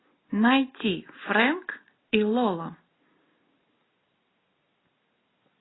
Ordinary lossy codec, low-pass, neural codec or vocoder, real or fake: AAC, 16 kbps; 7.2 kHz; none; real